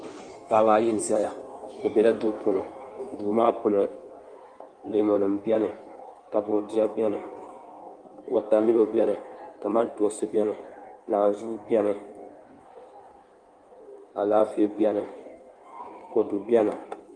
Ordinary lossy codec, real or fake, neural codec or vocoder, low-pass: Opus, 32 kbps; fake; codec, 16 kHz in and 24 kHz out, 1.1 kbps, FireRedTTS-2 codec; 9.9 kHz